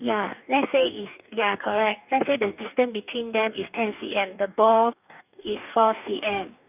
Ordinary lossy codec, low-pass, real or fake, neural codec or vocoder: none; 3.6 kHz; fake; codec, 44.1 kHz, 2.6 kbps, DAC